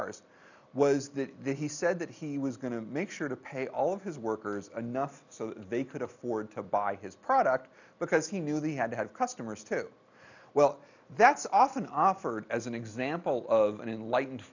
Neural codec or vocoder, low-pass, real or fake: none; 7.2 kHz; real